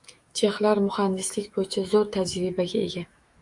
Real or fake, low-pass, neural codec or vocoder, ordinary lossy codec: fake; 10.8 kHz; autoencoder, 48 kHz, 128 numbers a frame, DAC-VAE, trained on Japanese speech; Opus, 64 kbps